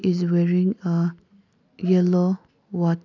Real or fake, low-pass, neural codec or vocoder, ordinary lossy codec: real; 7.2 kHz; none; none